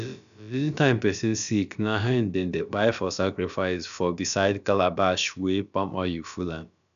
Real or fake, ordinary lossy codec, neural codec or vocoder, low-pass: fake; none; codec, 16 kHz, about 1 kbps, DyCAST, with the encoder's durations; 7.2 kHz